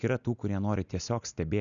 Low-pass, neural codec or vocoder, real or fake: 7.2 kHz; none; real